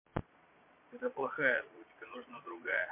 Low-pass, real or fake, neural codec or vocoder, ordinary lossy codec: 3.6 kHz; fake; vocoder, 22.05 kHz, 80 mel bands, Vocos; MP3, 24 kbps